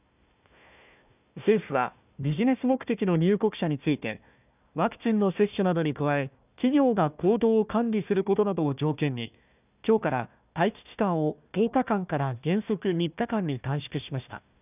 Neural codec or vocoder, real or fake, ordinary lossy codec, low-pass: codec, 16 kHz, 1 kbps, FunCodec, trained on Chinese and English, 50 frames a second; fake; none; 3.6 kHz